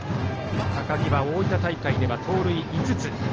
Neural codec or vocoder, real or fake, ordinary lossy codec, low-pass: none; real; Opus, 16 kbps; 7.2 kHz